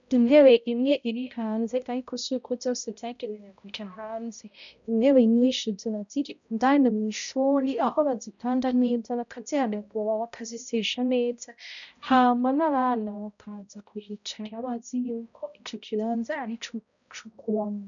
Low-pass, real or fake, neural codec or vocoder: 7.2 kHz; fake; codec, 16 kHz, 0.5 kbps, X-Codec, HuBERT features, trained on balanced general audio